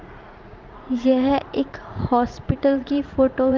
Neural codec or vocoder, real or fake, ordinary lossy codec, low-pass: vocoder, 44.1 kHz, 80 mel bands, Vocos; fake; Opus, 24 kbps; 7.2 kHz